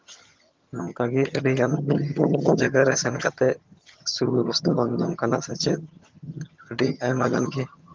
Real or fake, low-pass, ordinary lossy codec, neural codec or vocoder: fake; 7.2 kHz; Opus, 24 kbps; vocoder, 22.05 kHz, 80 mel bands, HiFi-GAN